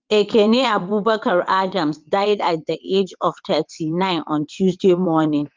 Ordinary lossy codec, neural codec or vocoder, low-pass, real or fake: Opus, 24 kbps; vocoder, 22.05 kHz, 80 mel bands, WaveNeXt; 7.2 kHz; fake